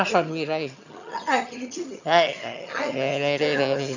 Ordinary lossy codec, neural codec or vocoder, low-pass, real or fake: none; vocoder, 22.05 kHz, 80 mel bands, HiFi-GAN; 7.2 kHz; fake